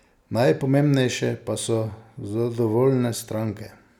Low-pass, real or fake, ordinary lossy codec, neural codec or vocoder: 19.8 kHz; real; none; none